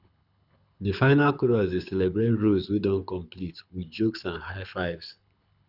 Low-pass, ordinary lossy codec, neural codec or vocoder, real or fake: 5.4 kHz; none; codec, 24 kHz, 6 kbps, HILCodec; fake